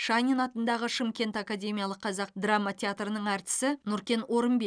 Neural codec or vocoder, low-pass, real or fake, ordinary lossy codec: none; 9.9 kHz; real; none